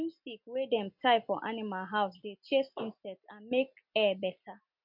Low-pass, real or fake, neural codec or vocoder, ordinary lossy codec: 5.4 kHz; real; none; MP3, 48 kbps